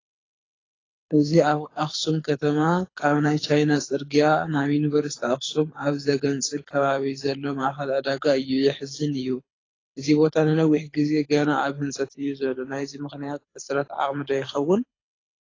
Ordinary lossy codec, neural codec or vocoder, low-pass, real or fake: AAC, 32 kbps; codec, 24 kHz, 6 kbps, HILCodec; 7.2 kHz; fake